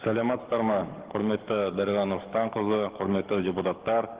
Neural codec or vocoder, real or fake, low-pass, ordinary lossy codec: codec, 44.1 kHz, 7.8 kbps, DAC; fake; 3.6 kHz; Opus, 16 kbps